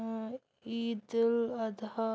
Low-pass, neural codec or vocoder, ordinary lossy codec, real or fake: none; none; none; real